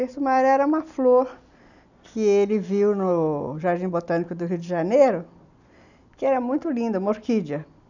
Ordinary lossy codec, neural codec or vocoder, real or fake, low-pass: none; none; real; 7.2 kHz